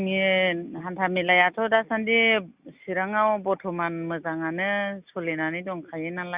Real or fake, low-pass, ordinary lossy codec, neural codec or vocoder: real; 3.6 kHz; Opus, 64 kbps; none